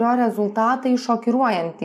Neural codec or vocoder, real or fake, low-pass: none; real; 14.4 kHz